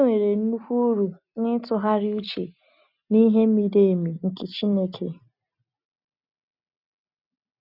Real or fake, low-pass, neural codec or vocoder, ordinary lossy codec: real; 5.4 kHz; none; none